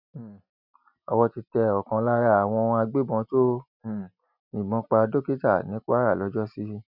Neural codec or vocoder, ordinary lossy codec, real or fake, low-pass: none; none; real; 5.4 kHz